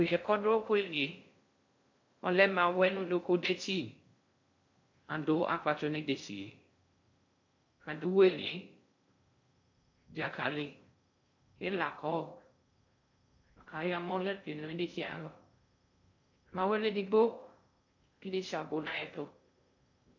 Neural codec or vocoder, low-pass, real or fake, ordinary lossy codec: codec, 16 kHz in and 24 kHz out, 0.6 kbps, FocalCodec, streaming, 2048 codes; 7.2 kHz; fake; MP3, 48 kbps